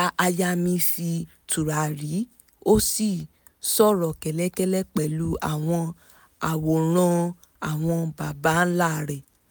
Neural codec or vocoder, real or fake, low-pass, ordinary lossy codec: none; real; none; none